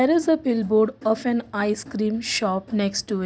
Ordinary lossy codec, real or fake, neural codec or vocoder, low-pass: none; real; none; none